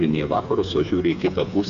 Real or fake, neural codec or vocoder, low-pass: fake; codec, 16 kHz, 4 kbps, FreqCodec, smaller model; 7.2 kHz